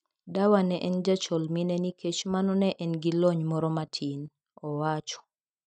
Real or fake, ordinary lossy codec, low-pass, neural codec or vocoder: real; none; 9.9 kHz; none